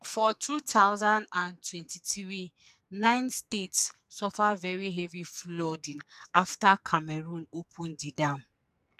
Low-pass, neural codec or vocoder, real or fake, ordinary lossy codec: 14.4 kHz; codec, 44.1 kHz, 2.6 kbps, SNAC; fake; none